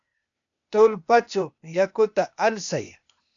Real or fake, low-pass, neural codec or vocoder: fake; 7.2 kHz; codec, 16 kHz, 0.8 kbps, ZipCodec